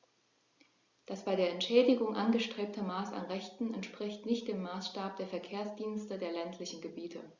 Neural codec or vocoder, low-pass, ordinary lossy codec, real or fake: none; 7.2 kHz; Opus, 64 kbps; real